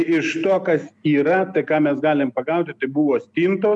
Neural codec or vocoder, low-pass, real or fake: none; 10.8 kHz; real